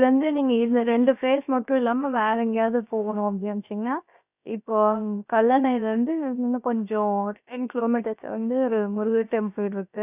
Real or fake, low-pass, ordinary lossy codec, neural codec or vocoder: fake; 3.6 kHz; none; codec, 16 kHz, about 1 kbps, DyCAST, with the encoder's durations